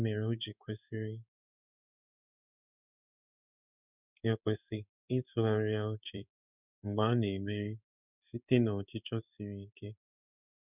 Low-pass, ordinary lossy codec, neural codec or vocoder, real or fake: 3.6 kHz; none; codec, 16 kHz in and 24 kHz out, 1 kbps, XY-Tokenizer; fake